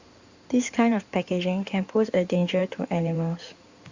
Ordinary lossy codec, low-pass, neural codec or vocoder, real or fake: Opus, 64 kbps; 7.2 kHz; codec, 16 kHz in and 24 kHz out, 2.2 kbps, FireRedTTS-2 codec; fake